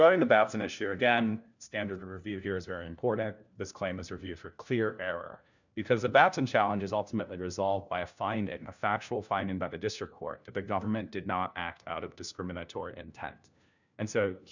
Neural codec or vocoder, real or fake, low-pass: codec, 16 kHz, 1 kbps, FunCodec, trained on LibriTTS, 50 frames a second; fake; 7.2 kHz